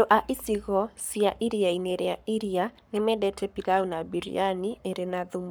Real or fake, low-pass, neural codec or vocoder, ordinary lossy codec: fake; none; codec, 44.1 kHz, 7.8 kbps, Pupu-Codec; none